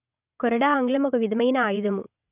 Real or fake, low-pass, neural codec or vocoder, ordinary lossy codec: fake; 3.6 kHz; vocoder, 22.05 kHz, 80 mel bands, WaveNeXt; none